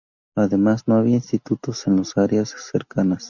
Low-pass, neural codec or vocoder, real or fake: 7.2 kHz; none; real